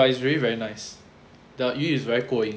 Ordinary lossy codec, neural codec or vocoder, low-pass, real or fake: none; none; none; real